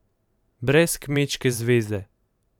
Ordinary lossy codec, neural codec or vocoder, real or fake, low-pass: none; vocoder, 44.1 kHz, 128 mel bands every 512 samples, BigVGAN v2; fake; 19.8 kHz